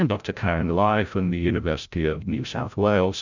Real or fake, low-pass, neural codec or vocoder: fake; 7.2 kHz; codec, 16 kHz, 0.5 kbps, FreqCodec, larger model